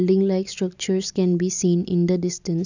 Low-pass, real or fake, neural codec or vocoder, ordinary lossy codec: 7.2 kHz; real; none; none